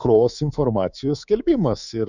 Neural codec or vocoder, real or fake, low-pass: codec, 24 kHz, 3.1 kbps, DualCodec; fake; 7.2 kHz